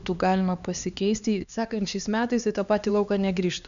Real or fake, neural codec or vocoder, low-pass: fake; codec, 16 kHz, 2 kbps, X-Codec, HuBERT features, trained on LibriSpeech; 7.2 kHz